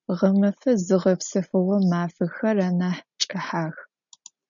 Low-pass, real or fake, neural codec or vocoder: 7.2 kHz; real; none